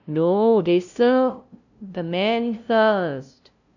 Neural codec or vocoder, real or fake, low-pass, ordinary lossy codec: codec, 16 kHz, 0.5 kbps, FunCodec, trained on LibriTTS, 25 frames a second; fake; 7.2 kHz; none